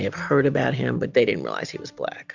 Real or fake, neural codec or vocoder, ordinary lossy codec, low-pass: real; none; Opus, 64 kbps; 7.2 kHz